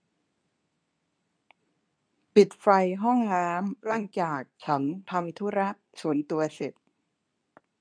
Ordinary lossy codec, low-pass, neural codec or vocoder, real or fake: none; 9.9 kHz; codec, 24 kHz, 0.9 kbps, WavTokenizer, medium speech release version 2; fake